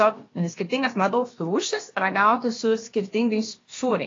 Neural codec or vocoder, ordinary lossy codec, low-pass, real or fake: codec, 16 kHz, about 1 kbps, DyCAST, with the encoder's durations; AAC, 32 kbps; 7.2 kHz; fake